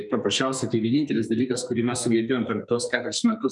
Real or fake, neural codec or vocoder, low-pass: fake; codec, 44.1 kHz, 2.6 kbps, SNAC; 10.8 kHz